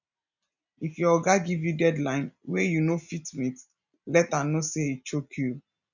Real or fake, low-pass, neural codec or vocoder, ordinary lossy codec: real; 7.2 kHz; none; none